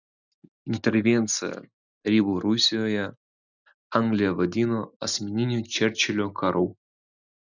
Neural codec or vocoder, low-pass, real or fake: none; 7.2 kHz; real